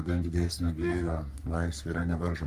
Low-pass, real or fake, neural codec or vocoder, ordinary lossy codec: 14.4 kHz; fake; codec, 44.1 kHz, 3.4 kbps, Pupu-Codec; Opus, 32 kbps